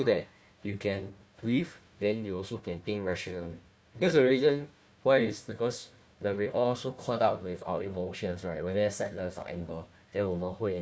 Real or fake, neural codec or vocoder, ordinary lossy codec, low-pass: fake; codec, 16 kHz, 1 kbps, FunCodec, trained on Chinese and English, 50 frames a second; none; none